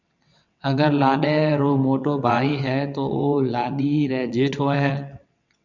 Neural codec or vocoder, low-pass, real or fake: vocoder, 22.05 kHz, 80 mel bands, WaveNeXt; 7.2 kHz; fake